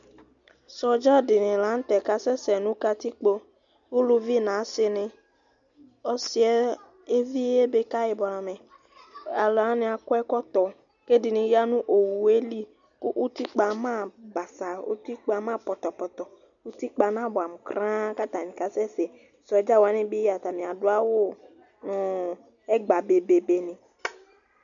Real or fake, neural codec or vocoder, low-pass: real; none; 7.2 kHz